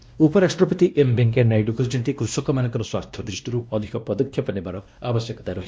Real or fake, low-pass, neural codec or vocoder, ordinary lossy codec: fake; none; codec, 16 kHz, 1 kbps, X-Codec, WavLM features, trained on Multilingual LibriSpeech; none